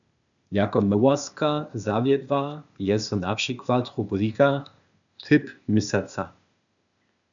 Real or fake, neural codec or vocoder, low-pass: fake; codec, 16 kHz, 0.8 kbps, ZipCodec; 7.2 kHz